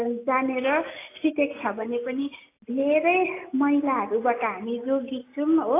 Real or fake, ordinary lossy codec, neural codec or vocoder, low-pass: real; AAC, 24 kbps; none; 3.6 kHz